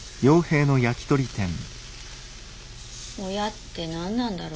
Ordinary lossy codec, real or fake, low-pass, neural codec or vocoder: none; real; none; none